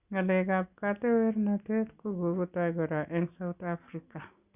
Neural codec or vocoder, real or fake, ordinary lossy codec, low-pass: none; real; Opus, 64 kbps; 3.6 kHz